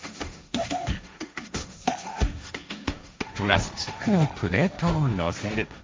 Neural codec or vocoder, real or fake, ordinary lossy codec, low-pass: codec, 16 kHz, 1.1 kbps, Voila-Tokenizer; fake; none; none